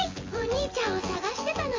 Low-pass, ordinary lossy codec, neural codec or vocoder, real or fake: 7.2 kHz; MP3, 32 kbps; vocoder, 24 kHz, 100 mel bands, Vocos; fake